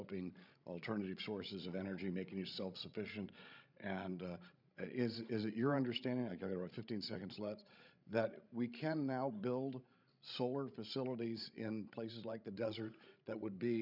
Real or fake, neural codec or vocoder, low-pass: fake; codec, 16 kHz, 16 kbps, FreqCodec, larger model; 5.4 kHz